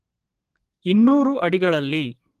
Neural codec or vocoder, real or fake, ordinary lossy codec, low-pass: codec, 32 kHz, 1.9 kbps, SNAC; fake; Opus, 24 kbps; 14.4 kHz